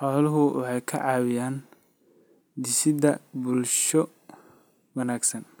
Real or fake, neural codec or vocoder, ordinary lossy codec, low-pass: real; none; none; none